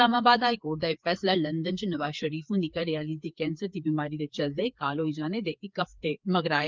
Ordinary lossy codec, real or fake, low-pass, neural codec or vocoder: Opus, 32 kbps; fake; 7.2 kHz; codec, 16 kHz, 4 kbps, FreqCodec, larger model